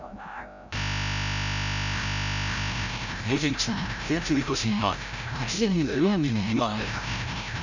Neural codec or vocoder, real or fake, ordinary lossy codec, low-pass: codec, 16 kHz, 0.5 kbps, FreqCodec, larger model; fake; none; 7.2 kHz